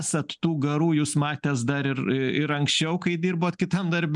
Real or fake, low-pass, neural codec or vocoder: real; 10.8 kHz; none